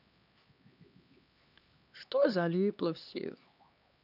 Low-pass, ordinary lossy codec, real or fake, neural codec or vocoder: 5.4 kHz; none; fake; codec, 16 kHz, 2 kbps, X-Codec, HuBERT features, trained on LibriSpeech